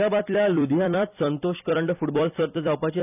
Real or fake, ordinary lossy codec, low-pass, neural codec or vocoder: fake; none; 3.6 kHz; vocoder, 44.1 kHz, 128 mel bands every 256 samples, BigVGAN v2